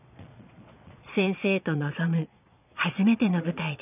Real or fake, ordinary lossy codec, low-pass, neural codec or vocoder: fake; none; 3.6 kHz; vocoder, 22.05 kHz, 80 mel bands, Vocos